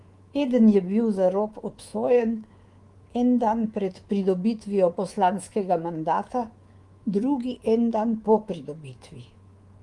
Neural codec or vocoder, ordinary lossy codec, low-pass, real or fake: codec, 24 kHz, 3.1 kbps, DualCodec; Opus, 24 kbps; 10.8 kHz; fake